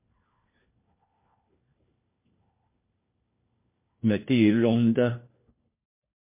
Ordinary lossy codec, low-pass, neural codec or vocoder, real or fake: MP3, 24 kbps; 3.6 kHz; codec, 16 kHz, 1 kbps, FunCodec, trained on LibriTTS, 50 frames a second; fake